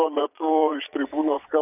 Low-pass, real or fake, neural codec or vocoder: 3.6 kHz; fake; vocoder, 22.05 kHz, 80 mel bands, Vocos